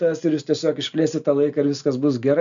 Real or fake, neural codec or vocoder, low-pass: real; none; 7.2 kHz